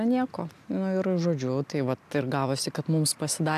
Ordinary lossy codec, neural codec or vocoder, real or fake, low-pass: AAC, 96 kbps; none; real; 14.4 kHz